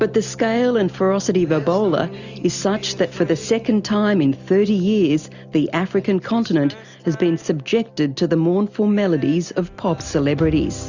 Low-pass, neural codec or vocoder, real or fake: 7.2 kHz; none; real